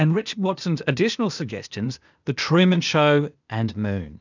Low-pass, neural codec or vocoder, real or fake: 7.2 kHz; codec, 16 kHz, 0.8 kbps, ZipCodec; fake